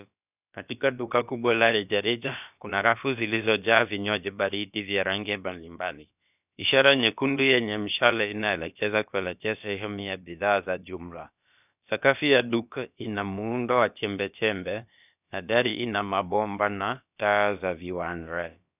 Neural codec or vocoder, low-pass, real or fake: codec, 16 kHz, about 1 kbps, DyCAST, with the encoder's durations; 3.6 kHz; fake